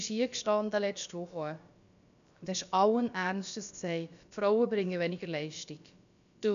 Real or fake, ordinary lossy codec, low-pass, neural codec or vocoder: fake; none; 7.2 kHz; codec, 16 kHz, about 1 kbps, DyCAST, with the encoder's durations